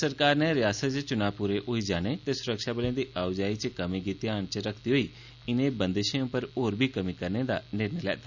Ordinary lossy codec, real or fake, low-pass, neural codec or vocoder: none; real; 7.2 kHz; none